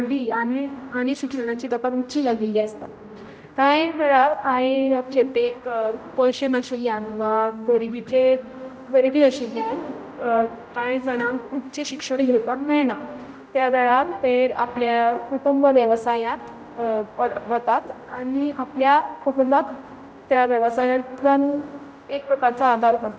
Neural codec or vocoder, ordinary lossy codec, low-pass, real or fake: codec, 16 kHz, 0.5 kbps, X-Codec, HuBERT features, trained on general audio; none; none; fake